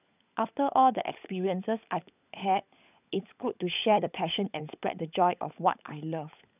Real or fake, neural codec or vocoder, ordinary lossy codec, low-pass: fake; codec, 16 kHz, 16 kbps, FunCodec, trained on LibriTTS, 50 frames a second; none; 3.6 kHz